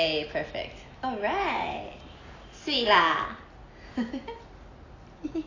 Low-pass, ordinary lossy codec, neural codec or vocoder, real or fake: 7.2 kHz; AAC, 32 kbps; none; real